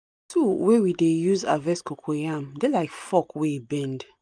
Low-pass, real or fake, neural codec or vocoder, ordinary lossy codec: 9.9 kHz; real; none; none